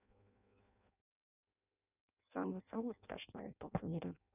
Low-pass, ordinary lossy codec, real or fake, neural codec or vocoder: 3.6 kHz; none; fake; codec, 16 kHz in and 24 kHz out, 0.6 kbps, FireRedTTS-2 codec